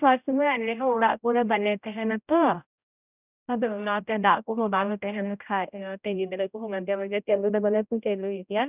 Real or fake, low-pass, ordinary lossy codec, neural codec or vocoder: fake; 3.6 kHz; none; codec, 16 kHz, 0.5 kbps, X-Codec, HuBERT features, trained on general audio